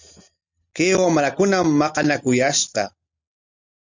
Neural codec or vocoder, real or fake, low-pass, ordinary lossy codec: none; real; 7.2 kHz; MP3, 64 kbps